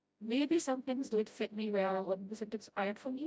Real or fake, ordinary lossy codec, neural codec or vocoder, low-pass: fake; none; codec, 16 kHz, 0.5 kbps, FreqCodec, smaller model; none